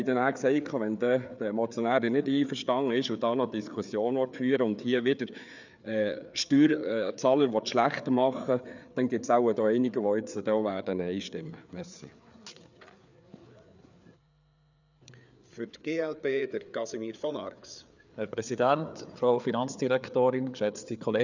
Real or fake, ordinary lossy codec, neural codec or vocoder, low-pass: fake; none; codec, 16 kHz, 4 kbps, FreqCodec, larger model; 7.2 kHz